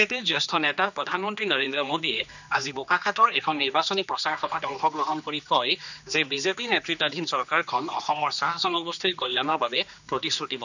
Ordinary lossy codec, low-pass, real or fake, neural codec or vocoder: none; 7.2 kHz; fake; codec, 16 kHz, 2 kbps, X-Codec, HuBERT features, trained on general audio